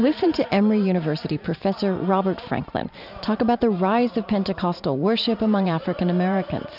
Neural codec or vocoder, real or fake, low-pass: vocoder, 44.1 kHz, 128 mel bands every 512 samples, BigVGAN v2; fake; 5.4 kHz